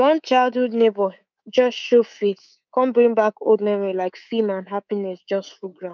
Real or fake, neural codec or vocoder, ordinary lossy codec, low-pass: fake; codec, 24 kHz, 3.1 kbps, DualCodec; none; 7.2 kHz